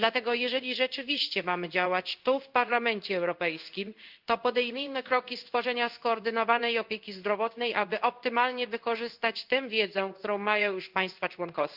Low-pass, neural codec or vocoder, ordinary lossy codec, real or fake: 5.4 kHz; codec, 16 kHz in and 24 kHz out, 1 kbps, XY-Tokenizer; Opus, 24 kbps; fake